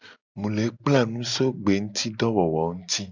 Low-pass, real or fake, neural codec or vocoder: 7.2 kHz; real; none